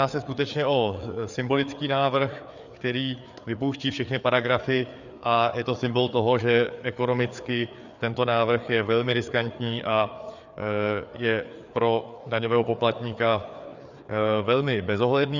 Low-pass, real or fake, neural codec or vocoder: 7.2 kHz; fake; codec, 16 kHz, 4 kbps, FreqCodec, larger model